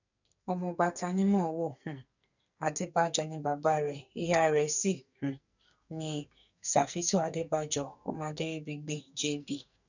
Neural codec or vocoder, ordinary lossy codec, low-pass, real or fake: codec, 44.1 kHz, 2.6 kbps, SNAC; none; 7.2 kHz; fake